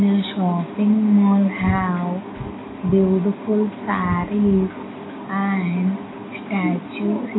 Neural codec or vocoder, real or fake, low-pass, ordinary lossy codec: none; real; 7.2 kHz; AAC, 16 kbps